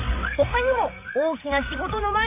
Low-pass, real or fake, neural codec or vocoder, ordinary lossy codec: 3.6 kHz; fake; codec, 16 kHz, 8 kbps, FreqCodec, larger model; MP3, 24 kbps